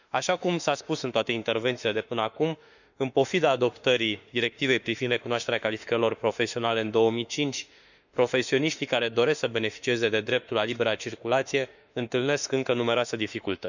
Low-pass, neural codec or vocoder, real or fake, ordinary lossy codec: 7.2 kHz; autoencoder, 48 kHz, 32 numbers a frame, DAC-VAE, trained on Japanese speech; fake; none